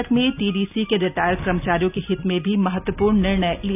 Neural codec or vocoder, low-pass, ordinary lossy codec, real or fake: none; 3.6 kHz; none; real